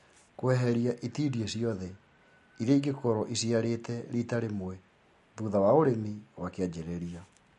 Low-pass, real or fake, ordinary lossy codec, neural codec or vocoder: 14.4 kHz; real; MP3, 48 kbps; none